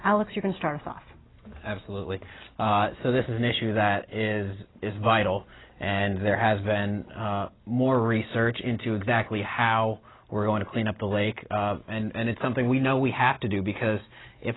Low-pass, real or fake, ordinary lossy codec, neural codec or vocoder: 7.2 kHz; real; AAC, 16 kbps; none